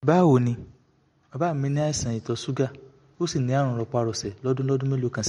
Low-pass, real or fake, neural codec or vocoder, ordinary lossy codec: 19.8 kHz; real; none; MP3, 48 kbps